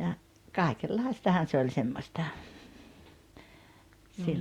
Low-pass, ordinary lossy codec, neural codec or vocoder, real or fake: 19.8 kHz; Opus, 64 kbps; none; real